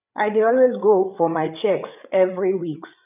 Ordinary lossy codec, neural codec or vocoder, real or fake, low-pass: none; codec, 16 kHz, 8 kbps, FreqCodec, larger model; fake; 3.6 kHz